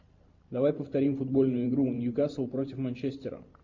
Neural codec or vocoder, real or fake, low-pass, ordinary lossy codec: vocoder, 44.1 kHz, 128 mel bands every 512 samples, BigVGAN v2; fake; 7.2 kHz; MP3, 48 kbps